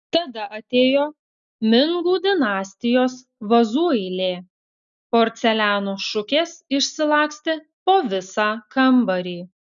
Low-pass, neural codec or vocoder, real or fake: 7.2 kHz; none; real